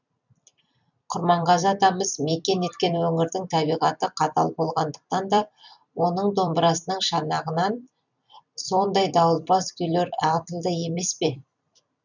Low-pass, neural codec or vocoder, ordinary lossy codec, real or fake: 7.2 kHz; vocoder, 44.1 kHz, 128 mel bands every 256 samples, BigVGAN v2; none; fake